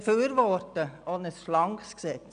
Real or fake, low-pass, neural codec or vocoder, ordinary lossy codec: real; 9.9 kHz; none; MP3, 96 kbps